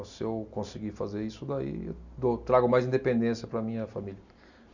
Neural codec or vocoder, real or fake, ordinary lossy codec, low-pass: none; real; none; 7.2 kHz